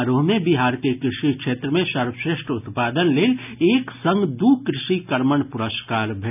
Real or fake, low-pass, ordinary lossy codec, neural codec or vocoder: real; 3.6 kHz; none; none